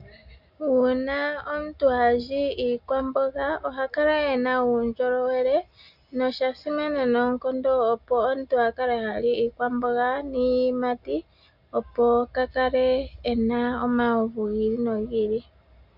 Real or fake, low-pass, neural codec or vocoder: real; 5.4 kHz; none